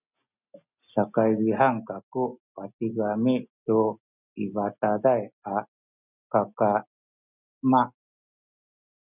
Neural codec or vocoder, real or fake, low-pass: none; real; 3.6 kHz